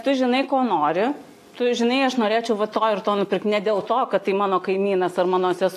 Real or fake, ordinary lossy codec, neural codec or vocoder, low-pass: real; AAC, 64 kbps; none; 14.4 kHz